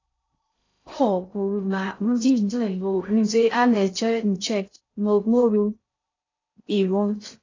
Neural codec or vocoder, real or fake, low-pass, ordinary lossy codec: codec, 16 kHz in and 24 kHz out, 0.6 kbps, FocalCodec, streaming, 2048 codes; fake; 7.2 kHz; AAC, 32 kbps